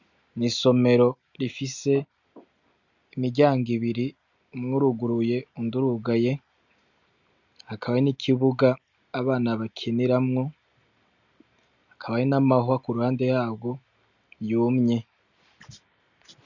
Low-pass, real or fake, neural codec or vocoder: 7.2 kHz; real; none